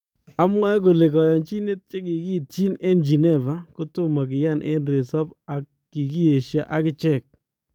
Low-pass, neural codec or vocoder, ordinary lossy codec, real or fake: 19.8 kHz; codec, 44.1 kHz, 7.8 kbps, DAC; none; fake